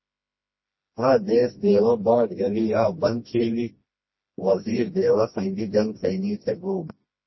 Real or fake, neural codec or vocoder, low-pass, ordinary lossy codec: fake; codec, 16 kHz, 1 kbps, FreqCodec, smaller model; 7.2 kHz; MP3, 24 kbps